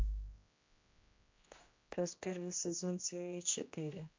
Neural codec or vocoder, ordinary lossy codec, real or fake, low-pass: codec, 16 kHz, 1 kbps, X-Codec, HuBERT features, trained on general audio; MP3, 48 kbps; fake; 7.2 kHz